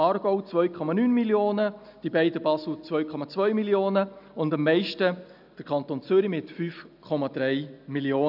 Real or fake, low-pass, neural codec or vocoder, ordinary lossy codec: real; 5.4 kHz; none; none